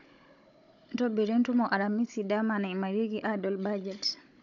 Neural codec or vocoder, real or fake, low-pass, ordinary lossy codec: codec, 16 kHz, 16 kbps, FunCodec, trained on Chinese and English, 50 frames a second; fake; 7.2 kHz; none